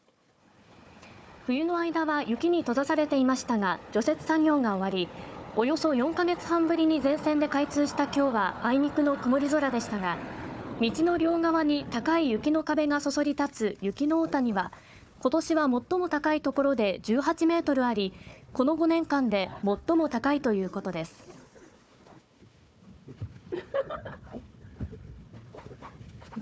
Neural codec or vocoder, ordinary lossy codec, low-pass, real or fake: codec, 16 kHz, 4 kbps, FunCodec, trained on Chinese and English, 50 frames a second; none; none; fake